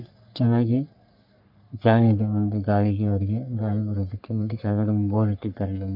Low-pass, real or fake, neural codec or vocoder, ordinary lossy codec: 5.4 kHz; fake; codec, 44.1 kHz, 3.4 kbps, Pupu-Codec; none